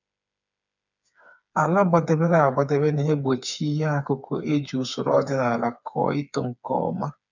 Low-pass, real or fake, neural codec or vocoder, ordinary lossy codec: 7.2 kHz; fake; codec, 16 kHz, 4 kbps, FreqCodec, smaller model; none